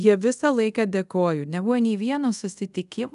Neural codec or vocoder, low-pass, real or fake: codec, 24 kHz, 0.5 kbps, DualCodec; 10.8 kHz; fake